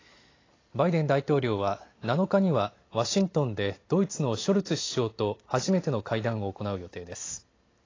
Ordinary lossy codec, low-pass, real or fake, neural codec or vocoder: AAC, 32 kbps; 7.2 kHz; real; none